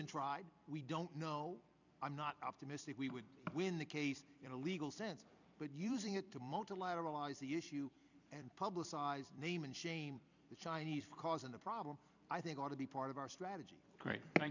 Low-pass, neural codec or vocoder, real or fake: 7.2 kHz; vocoder, 44.1 kHz, 128 mel bands every 256 samples, BigVGAN v2; fake